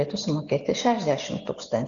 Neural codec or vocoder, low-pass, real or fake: none; 7.2 kHz; real